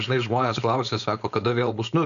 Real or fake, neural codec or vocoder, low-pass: fake; codec, 16 kHz, 4.8 kbps, FACodec; 7.2 kHz